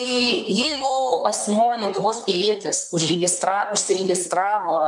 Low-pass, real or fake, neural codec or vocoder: 10.8 kHz; fake; codec, 24 kHz, 1 kbps, SNAC